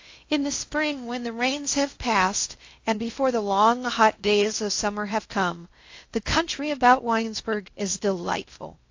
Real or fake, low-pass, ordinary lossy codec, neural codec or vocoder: fake; 7.2 kHz; AAC, 48 kbps; codec, 16 kHz in and 24 kHz out, 0.6 kbps, FocalCodec, streaming, 2048 codes